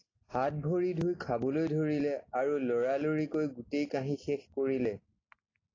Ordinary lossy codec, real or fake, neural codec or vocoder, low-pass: AAC, 32 kbps; real; none; 7.2 kHz